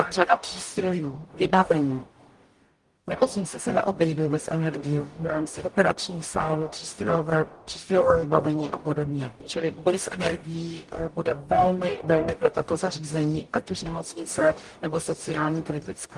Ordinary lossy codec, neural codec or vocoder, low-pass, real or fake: Opus, 24 kbps; codec, 44.1 kHz, 0.9 kbps, DAC; 10.8 kHz; fake